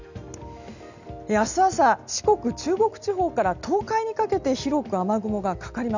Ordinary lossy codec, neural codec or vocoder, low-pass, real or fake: none; none; 7.2 kHz; real